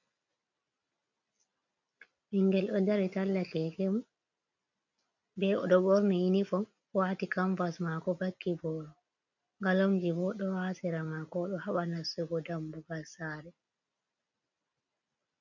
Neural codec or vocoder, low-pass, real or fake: none; 7.2 kHz; real